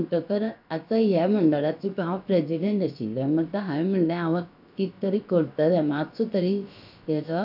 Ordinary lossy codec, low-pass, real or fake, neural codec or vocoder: none; 5.4 kHz; fake; codec, 16 kHz, about 1 kbps, DyCAST, with the encoder's durations